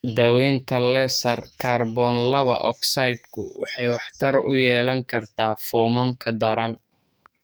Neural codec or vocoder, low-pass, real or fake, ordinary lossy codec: codec, 44.1 kHz, 2.6 kbps, SNAC; none; fake; none